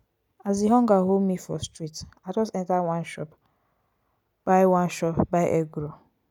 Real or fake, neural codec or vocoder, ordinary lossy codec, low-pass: real; none; none; 19.8 kHz